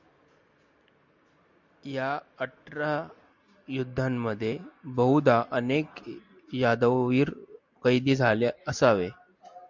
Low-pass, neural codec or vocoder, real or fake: 7.2 kHz; none; real